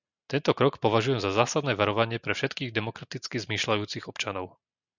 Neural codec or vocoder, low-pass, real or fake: none; 7.2 kHz; real